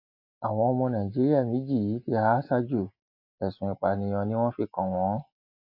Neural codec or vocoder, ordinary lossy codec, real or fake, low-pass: none; AAC, 48 kbps; real; 5.4 kHz